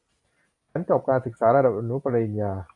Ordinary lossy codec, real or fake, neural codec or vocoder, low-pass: AAC, 64 kbps; real; none; 10.8 kHz